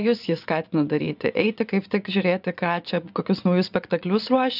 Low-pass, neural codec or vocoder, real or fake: 5.4 kHz; vocoder, 24 kHz, 100 mel bands, Vocos; fake